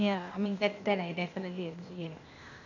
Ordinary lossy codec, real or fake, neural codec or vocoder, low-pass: none; fake; codec, 16 kHz, 0.8 kbps, ZipCodec; 7.2 kHz